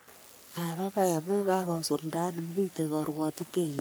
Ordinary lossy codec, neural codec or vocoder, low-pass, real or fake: none; codec, 44.1 kHz, 3.4 kbps, Pupu-Codec; none; fake